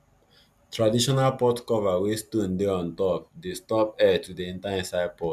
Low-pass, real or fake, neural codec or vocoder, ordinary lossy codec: 14.4 kHz; real; none; none